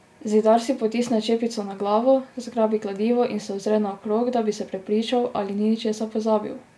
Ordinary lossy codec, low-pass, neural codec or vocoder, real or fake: none; none; none; real